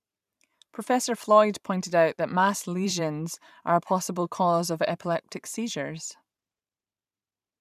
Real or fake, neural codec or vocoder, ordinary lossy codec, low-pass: fake; vocoder, 44.1 kHz, 128 mel bands every 512 samples, BigVGAN v2; none; 14.4 kHz